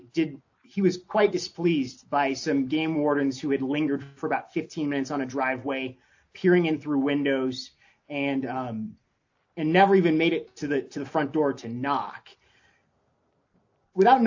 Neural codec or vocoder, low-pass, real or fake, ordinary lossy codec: none; 7.2 kHz; real; AAC, 48 kbps